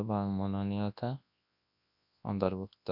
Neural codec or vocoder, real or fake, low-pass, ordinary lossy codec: codec, 24 kHz, 0.9 kbps, WavTokenizer, large speech release; fake; 5.4 kHz; none